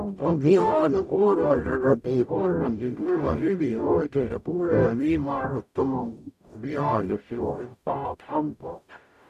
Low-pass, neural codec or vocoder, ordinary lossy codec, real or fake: 14.4 kHz; codec, 44.1 kHz, 0.9 kbps, DAC; none; fake